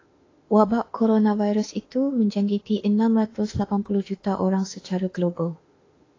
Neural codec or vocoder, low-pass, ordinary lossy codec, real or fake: autoencoder, 48 kHz, 32 numbers a frame, DAC-VAE, trained on Japanese speech; 7.2 kHz; AAC, 32 kbps; fake